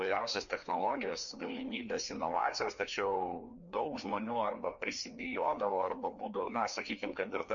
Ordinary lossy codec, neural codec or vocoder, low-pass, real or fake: MP3, 48 kbps; codec, 16 kHz, 2 kbps, FreqCodec, larger model; 7.2 kHz; fake